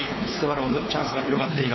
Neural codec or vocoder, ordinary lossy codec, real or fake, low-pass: codec, 16 kHz, 4 kbps, X-Codec, WavLM features, trained on Multilingual LibriSpeech; MP3, 24 kbps; fake; 7.2 kHz